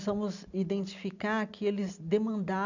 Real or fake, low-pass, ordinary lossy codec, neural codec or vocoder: real; 7.2 kHz; none; none